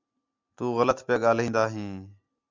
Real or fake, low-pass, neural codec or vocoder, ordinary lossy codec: real; 7.2 kHz; none; MP3, 64 kbps